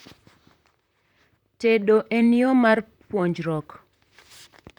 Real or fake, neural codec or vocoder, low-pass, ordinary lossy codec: fake; vocoder, 44.1 kHz, 128 mel bands, Pupu-Vocoder; 19.8 kHz; none